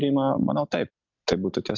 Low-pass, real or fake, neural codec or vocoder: 7.2 kHz; real; none